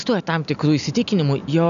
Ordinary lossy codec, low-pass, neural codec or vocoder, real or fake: MP3, 96 kbps; 7.2 kHz; none; real